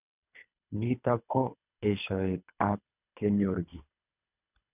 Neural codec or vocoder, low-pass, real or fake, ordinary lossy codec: codec, 24 kHz, 3 kbps, HILCodec; 3.6 kHz; fake; none